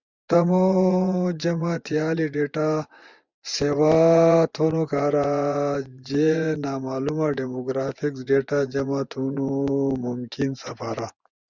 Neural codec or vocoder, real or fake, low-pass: vocoder, 24 kHz, 100 mel bands, Vocos; fake; 7.2 kHz